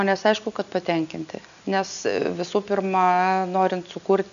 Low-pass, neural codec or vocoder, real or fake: 7.2 kHz; none; real